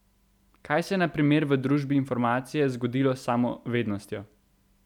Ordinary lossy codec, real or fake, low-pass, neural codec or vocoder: none; real; 19.8 kHz; none